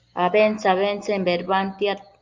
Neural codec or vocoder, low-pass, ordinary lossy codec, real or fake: none; 7.2 kHz; Opus, 24 kbps; real